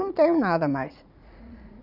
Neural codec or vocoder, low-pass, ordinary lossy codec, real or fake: vocoder, 44.1 kHz, 80 mel bands, Vocos; 5.4 kHz; none; fake